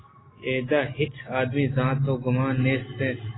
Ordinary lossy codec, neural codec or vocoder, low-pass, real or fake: AAC, 16 kbps; none; 7.2 kHz; real